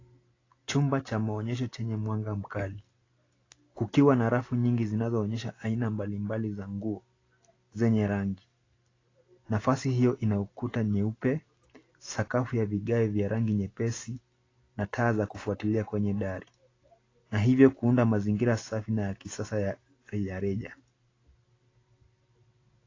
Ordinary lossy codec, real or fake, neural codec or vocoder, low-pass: AAC, 32 kbps; real; none; 7.2 kHz